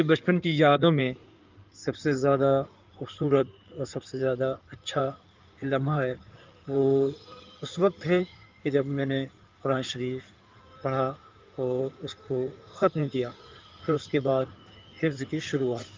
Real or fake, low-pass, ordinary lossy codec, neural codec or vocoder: fake; 7.2 kHz; Opus, 24 kbps; codec, 16 kHz in and 24 kHz out, 2.2 kbps, FireRedTTS-2 codec